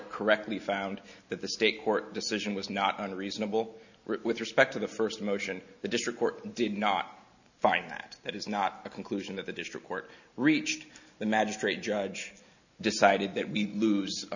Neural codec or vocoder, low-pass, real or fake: none; 7.2 kHz; real